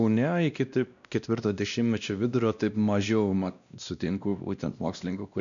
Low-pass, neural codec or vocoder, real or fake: 7.2 kHz; codec, 16 kHz, 1 kbps, X-Codec, WavLM features, trained on Multilingual LibriSpeech; fake